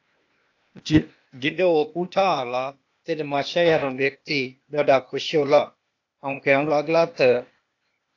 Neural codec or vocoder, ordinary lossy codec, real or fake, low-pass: codec, 16 kHz, 0.8 kbps, ZipCodec; AAC, 48 kbps; fake; 7.2 kHz